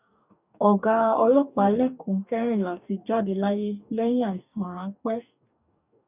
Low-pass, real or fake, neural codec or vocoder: 3.6 kHz; fake; codec, 44.1 kHz, 2.6 kbps, DAC